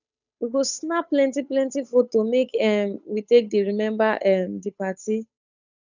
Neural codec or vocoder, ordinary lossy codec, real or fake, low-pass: codec, 16 kHz, 8 kbps, FunCodec, trained on Chinese and English, 25 frames a second; none; fake; 7.2 kHz